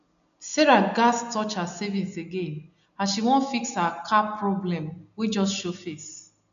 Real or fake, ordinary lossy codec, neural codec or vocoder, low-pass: real; none; none; 7.2 kHz